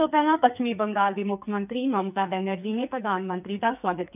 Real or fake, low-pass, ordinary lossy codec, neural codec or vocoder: fake; 3.6 kHz; none; codec, 44.1 kHz, 2.6 kbps, SNAC